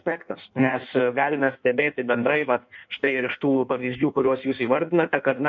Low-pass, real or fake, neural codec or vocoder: 7.2 kHz; fake; codec, 16 kHz in and 24 kHz out, 1.1 kbps, FireRedTTS-2 codec